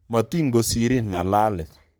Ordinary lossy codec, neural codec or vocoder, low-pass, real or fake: none; codec, 44.1 kHz, 3.4 kbps, Pupu-Codec; none; fake